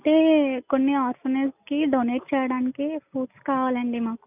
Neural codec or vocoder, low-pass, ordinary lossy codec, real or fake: none; 3.6 kHz; none; real